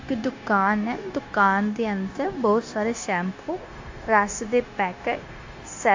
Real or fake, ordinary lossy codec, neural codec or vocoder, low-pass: fake; AAC, 48 kbps; codec, 16 kHz, 0.9 kbps, LongCat-Audio-Codec; 7.2 kHz